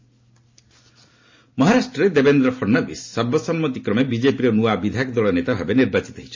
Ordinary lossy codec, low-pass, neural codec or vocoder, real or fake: MP3, 32 kbps; 7.2 kHz; none; real